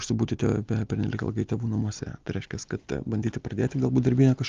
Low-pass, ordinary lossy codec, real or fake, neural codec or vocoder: 7.2 kHz; Opus, 32 kbps; real; none